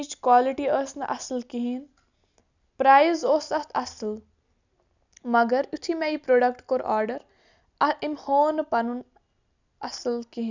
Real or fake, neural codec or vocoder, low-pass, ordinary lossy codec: real; none; 7.2 kHz; none